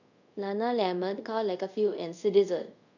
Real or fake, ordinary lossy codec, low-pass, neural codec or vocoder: fake; none; 7.2 kHz; codec, 24 kHz, 0.5 kbps, DualCodec